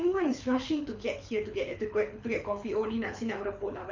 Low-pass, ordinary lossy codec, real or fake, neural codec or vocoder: 7.2 kHz; MP3, 64 kbps; fake; codec, 24 kHz, 6 kbps, HILCodec